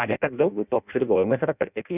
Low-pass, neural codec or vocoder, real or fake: 3.6 kHz; codec, 16 kHz in and 24 kHz out, 0.6 kbps, FireRedTTS-2 codec; fake